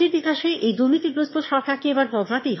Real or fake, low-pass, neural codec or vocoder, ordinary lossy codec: fake; 7.2 kHz; autoencoder, 22.05 kHz, a latent of 192 numbers a frame, VITS, trained on one speaker; MP3, 24 kbps